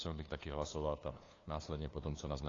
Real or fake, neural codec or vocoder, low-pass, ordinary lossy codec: fake; codec, 16 kHz, 2 kbps, FunCodec, trained on LibriTTS, 25 frames a second; 7.2 kHz; AAC, 32 kbps